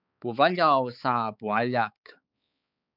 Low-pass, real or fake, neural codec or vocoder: 5.4 kHz; fake; codec, 16 kHz, 4 kbps, X-Codec, HuBERT features, trained on balanced general audio